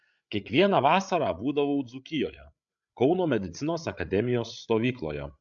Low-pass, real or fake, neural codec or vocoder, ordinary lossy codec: 7.2 kHz; fake; codec, 16 kHz, 8 kbps, FreqCodec, larger model; AAC, 64 kbps